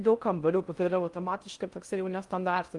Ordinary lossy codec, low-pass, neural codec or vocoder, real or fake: Opus, 32 kbps; 10.8 kHz; codec, 16 kHz in and 24 kHz out, 0.6 kbps, FocalCodec, streaming, 4096 codes; fake